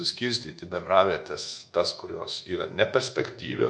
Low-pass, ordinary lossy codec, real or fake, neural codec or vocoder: 9.9 kHz; MP3, 64 kbps; fake; codec, 24 kHz, 1.2 kbps, DualCodec